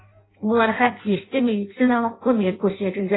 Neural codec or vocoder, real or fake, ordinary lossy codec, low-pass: codec, 16 kHz in and 24 kHz out, 0.6 kbps, FireRedTTS-2 codec; fake; AAC, 16 kbps; 7.2 kHz